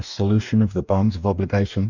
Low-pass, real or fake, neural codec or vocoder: 7.2 kHz; fake; codec, 44.1 kHz, 2.6 kbps, DAC